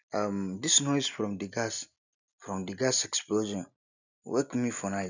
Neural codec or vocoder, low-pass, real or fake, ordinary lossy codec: none; 7.2 kHz; real; none